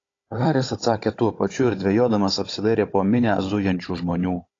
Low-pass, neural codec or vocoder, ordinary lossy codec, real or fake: 7.2 kHz; codec, 16 kHz, 16 kbps, FunCodec, trained on Chinese and English, 50 frames a second; AAC, 32 kbps; fake